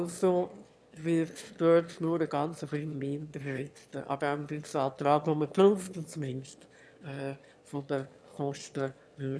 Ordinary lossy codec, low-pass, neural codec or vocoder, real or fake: none; none; autoencoder, 22.05 kHz, a latent of 192 numbers a frame, VITS, trained on one speaker; fake